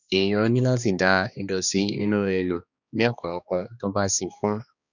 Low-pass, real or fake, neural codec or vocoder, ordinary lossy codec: 7.2 kHz; fake; codec, 16 kHz, 2 kbps, X-Codec, HuBERT features, trained on balanced general audio; none